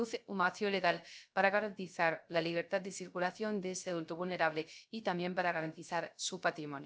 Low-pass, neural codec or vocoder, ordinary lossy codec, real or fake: none; codec, 16 kHz, 0.3 kbps, FocalCodec; none; fake